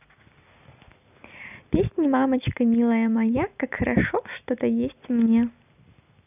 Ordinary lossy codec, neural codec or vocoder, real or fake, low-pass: none; none; real; 3.6 kHz